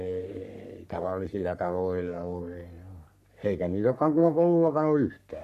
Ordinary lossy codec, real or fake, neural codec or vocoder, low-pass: none; fake; codec, 44.1 kHz, 3.4 kbps, Pupu-Codec; 14.4 kHz